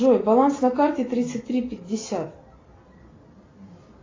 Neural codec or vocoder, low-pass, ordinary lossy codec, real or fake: none; 7.2 kHz; AAC, 32 kbps; real